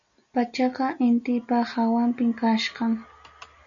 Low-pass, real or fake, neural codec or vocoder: 7.2 kHz; real; none